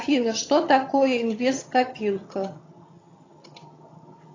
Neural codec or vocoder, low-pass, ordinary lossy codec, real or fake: vocoder, 22.05 kHz, 80 mel bands, HiFi-GAN; 7.2 kHz; AAC, 32 kbps; fake